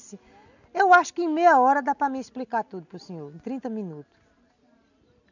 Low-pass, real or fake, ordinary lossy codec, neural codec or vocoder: 7.2 kHz; real; none; none